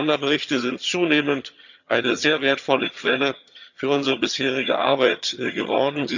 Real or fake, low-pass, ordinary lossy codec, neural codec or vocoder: fake; 7.2 kHz; none; vocoder, 22.05 kHz, 80 mel bands, HiFi-GAN